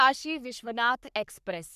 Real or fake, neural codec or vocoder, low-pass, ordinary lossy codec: fake; codec, 44.1 kHz, 3.4 kbps, Pupu-Codec; 14.4 kHz; none